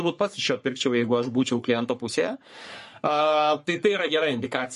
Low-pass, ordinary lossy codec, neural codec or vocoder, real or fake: 14.4 kHz; MP3, 48 kbps; codec, 44.1 kHz, 3.4 kbps, Pupu-Codec; fake